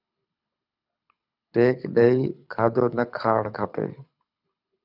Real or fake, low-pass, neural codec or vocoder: fake; 5.4 kHz; codec, 24 kHz, 6 kbps, HILCodec